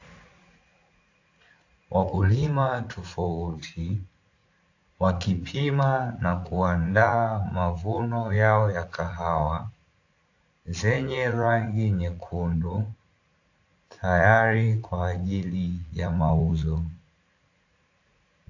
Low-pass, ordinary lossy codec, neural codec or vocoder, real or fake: 7.2 kHz; AAC, 48 kbps; vocoder, 44.1 kHz, 80 mel bands, Vocos; fake